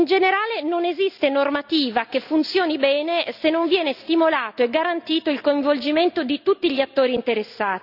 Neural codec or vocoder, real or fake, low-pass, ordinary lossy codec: none; real; 5.4 kHz; none